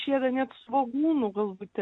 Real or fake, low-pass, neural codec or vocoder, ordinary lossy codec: real; 9.9 kHz; none; MP3, 48 kbps